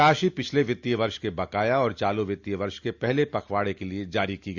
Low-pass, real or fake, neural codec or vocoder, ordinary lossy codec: 7.2 kHz; real; none; Opus, 64 kbps